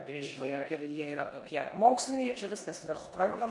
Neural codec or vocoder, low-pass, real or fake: codec, 16 kHz in and 24 kHz out, 0.9 kbps, LongCat-Audio-Codec, four codebook decoder; 10.8 kHz; fake